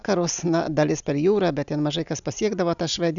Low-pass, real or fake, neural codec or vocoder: 7.2 kHz; real; none